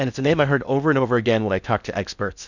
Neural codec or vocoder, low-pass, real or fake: codec, 16 kHz in and 24 kHz out, 0.6 kbps, FocalCodec, streaming, 4096 codes; 7.2 kHz; fake